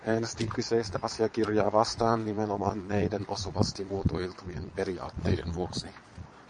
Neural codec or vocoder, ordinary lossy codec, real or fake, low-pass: vocoder, 22.05 kHz, 80 mel bands, Vocos; MP3, 48 kbps; fake; 9.9 kHz